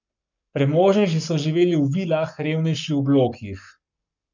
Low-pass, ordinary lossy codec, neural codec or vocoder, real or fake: 7.2 kHz; none; codec, 44.1 kHz, 7.8 kbps, Pupu-Codec; fake